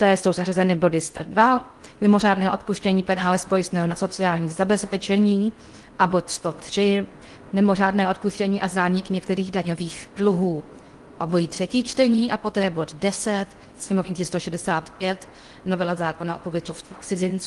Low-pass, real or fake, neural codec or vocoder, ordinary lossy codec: 10.8 kHz; fake; codec, 16 kHz in and 24 kHz out, 0.6 kbps, FocalCodec, streaming, 4096 codes; Opus, 24 kbps